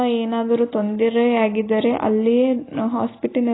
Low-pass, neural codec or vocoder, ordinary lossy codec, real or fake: 7.2 kHz; none; AAC, 16 kbps; real